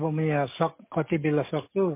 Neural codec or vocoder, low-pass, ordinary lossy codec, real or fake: codec, 44.1 kHz, 7.8 kbps, DAC; 3.6 kHz; MP3, 24 kbps; fake